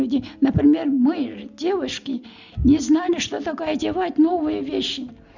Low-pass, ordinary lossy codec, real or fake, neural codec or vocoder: 7.2 kHz; none; real; none